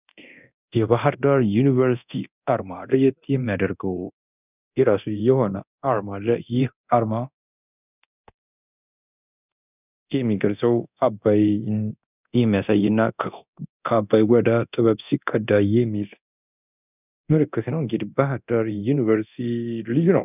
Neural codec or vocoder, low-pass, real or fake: codec, 24 kHz, 0.9 kbps, DualCodec; 3.6 kHz; fake